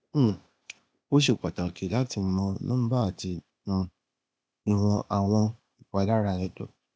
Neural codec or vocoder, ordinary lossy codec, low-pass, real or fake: codec, 16 kHz, 0.8 kbps, ZipCodec; none; none; fake